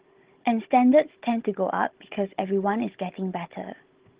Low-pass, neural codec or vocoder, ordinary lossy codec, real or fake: 3.6 kHz; codec, 16 kHz, 16 kbps, FunCodec, trained on Chinese and English, 50 frames a second; Opus, 16 kbps; fake